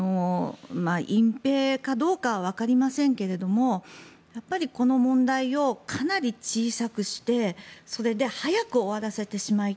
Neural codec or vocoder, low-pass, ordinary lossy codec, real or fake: none; none; none; real